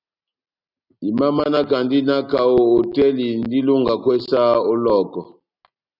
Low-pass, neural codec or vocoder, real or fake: 5.4 kHz; none; real